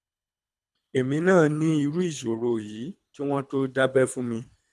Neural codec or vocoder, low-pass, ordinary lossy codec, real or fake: codec, 24 kHz, 3 kbps, HILCodec; none; none; fake